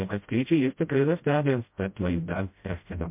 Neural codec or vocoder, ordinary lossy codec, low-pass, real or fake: codec, 16 kHz, 0.5 kbps, FreqCodec, smaller model; MP3, 32 kbps; 3.6 kHz; fake